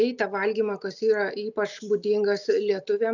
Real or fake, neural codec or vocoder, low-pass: real; none; 7.2 kHz